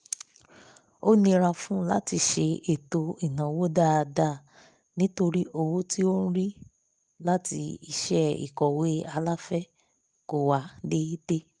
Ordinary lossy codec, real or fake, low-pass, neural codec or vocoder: Opus, 32 kbps; real; 10.8 kHz; none